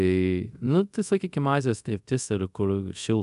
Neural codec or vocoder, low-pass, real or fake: codec, 24 kHz, 0.5 kbps, DualCodec; 10.8 kHz; fake